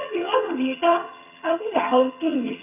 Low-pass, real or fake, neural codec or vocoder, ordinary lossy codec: 3.6 kHz; fake; vocoder, 22.05 kHz, 80 mel bands, HiFi-GAN; none